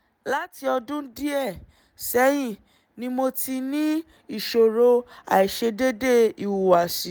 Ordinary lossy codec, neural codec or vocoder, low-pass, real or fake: none; none; none; real